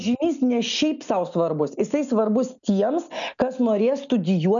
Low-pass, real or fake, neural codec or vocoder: 7.2 kHz; real; none